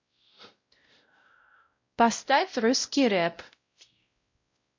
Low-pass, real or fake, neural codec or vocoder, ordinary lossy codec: 7.2 kHz; fake; codec, 16 kHz, 0.5 kbps, X-Codec, WavLM features, trained on Multilingual LibriSpeech; MP3, 48 kbps